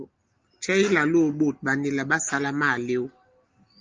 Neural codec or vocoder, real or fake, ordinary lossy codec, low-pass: none; real; Opus, 24 kbps; 7.2 kHz